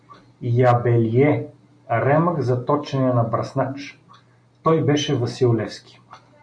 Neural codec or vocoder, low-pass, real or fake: none; 9.9 kHz; real